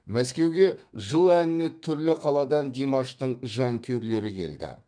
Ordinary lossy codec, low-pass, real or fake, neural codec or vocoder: none; 9.9 kHz; fake; codec, 32 kHz, 1.9 kbps, SNAC